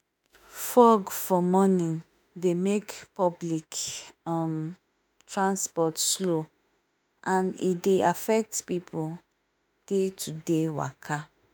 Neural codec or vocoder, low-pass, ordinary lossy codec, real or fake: autoencoder, 48 kHz, 32 numbers a frame, DAC-VAE, trained on Japanese speech; none; none; fake